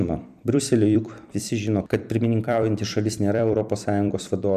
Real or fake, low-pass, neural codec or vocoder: fake; 14.4 kHz; vocoder, 44.1 kHz, 128 mel bands every 256 samples, BigVGAN v2